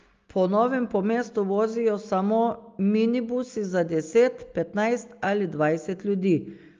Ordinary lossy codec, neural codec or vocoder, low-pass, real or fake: Opus, 32 kbps; none; 7.2 kHz; real